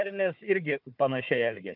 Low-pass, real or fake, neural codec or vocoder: 5.4 kHz; fake; codec, 16 kHz, 4 kbps, FunCodec, trained on Chinese and English, 50 frames a second